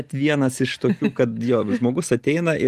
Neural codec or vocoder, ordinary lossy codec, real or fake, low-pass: none; Opus, 24 kbps; real; 14.4 kHz